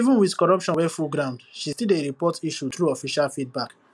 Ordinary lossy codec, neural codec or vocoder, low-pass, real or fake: none; none; none; real